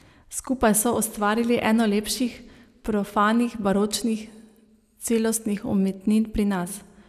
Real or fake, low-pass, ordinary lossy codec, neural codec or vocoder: real; 14.4 kHz; none; none